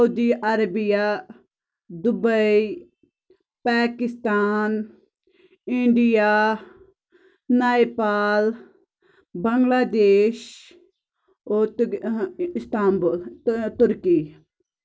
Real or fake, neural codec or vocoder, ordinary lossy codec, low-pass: real; none; none; none